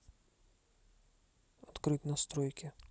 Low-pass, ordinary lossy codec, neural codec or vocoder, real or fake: none; none; none; real